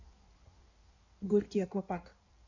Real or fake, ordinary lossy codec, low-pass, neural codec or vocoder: fake; none; 7.2 kHz; codec, 16 kHz in and 24 kHz out, 1.1 kbps, FireRedTTS-2 codec